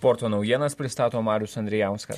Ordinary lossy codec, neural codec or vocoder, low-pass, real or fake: MP3, 64 kbps; none; 14.4 kHz; real